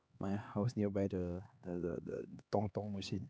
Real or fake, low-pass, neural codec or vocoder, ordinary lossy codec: fake; none; codec, 16 kHz, 2 kbps, X-Codec, HuBERT features, trained on LibriSpeech; none